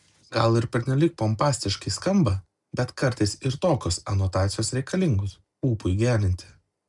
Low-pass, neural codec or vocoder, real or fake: 10.8 kHz; none; real